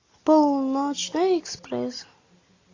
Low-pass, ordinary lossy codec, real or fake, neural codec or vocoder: 7.2 kHz; AAC, 32 kbps; real; none